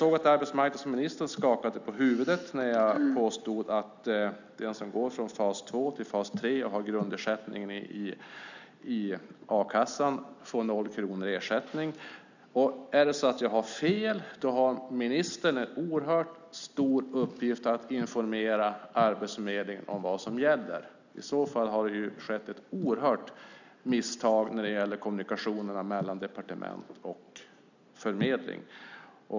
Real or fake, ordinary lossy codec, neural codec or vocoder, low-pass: real; none; none; 7.2 kHz